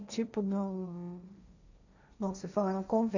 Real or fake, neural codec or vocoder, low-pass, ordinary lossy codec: fake; codec, 16 kHz, 1.1 kbps, Voila-Tokenizer; 7.2 kHz; none